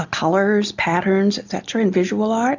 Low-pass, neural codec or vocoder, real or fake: 7.2 kHz; none; real